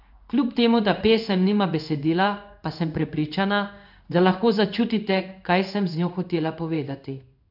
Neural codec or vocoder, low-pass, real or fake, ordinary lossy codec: codec, 16 kHz in and 24 kHz out, 1 kbps, XY-Tokenizer; 5.4 kHz; fake; none